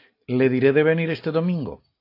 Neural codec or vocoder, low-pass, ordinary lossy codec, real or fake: none; 5.4 kHz; AAC, 32 kbps; real